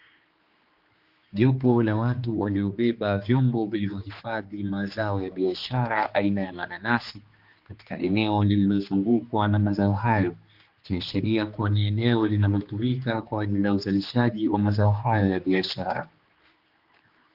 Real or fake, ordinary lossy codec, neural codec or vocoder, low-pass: fake; Opus, 32 kbps; codec, 16 kHz, 2 kbps, X-Codec, HuBERT features, trained on general audio; 5.4 kHz